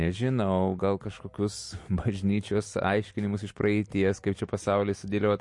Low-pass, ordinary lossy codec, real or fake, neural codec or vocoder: 10.8 kHz; MP3, 48 kbps; real; none